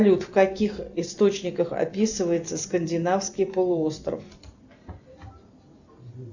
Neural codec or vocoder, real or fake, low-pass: none; real; 7.2 kHz